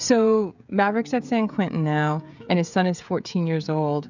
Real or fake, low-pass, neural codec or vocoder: fake; 7.2 kHz; codec, 16 kHz, 16 kbps, FreqCodec, smaller model